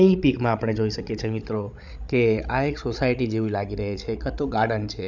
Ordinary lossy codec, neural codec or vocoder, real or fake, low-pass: none; codec, 16 kHz, 16 kbps, FreqCodec, larger model; fake; 7.2 kHz